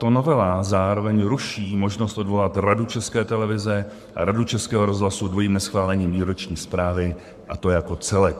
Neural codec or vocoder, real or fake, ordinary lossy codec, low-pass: codec, 44.1 kHz, 7.8 kbps, Pupu-Codec; fake; MP3, 96 kbps; 14.4 kHz